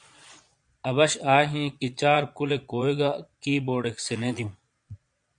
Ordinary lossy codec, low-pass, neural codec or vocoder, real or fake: MP3, 64 kbps; 9.9 kHz; vocoder, 22.05 kHz, 80 mel bands, Vocos; fake